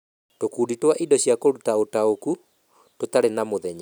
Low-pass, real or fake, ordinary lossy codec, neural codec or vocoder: none; real; none; none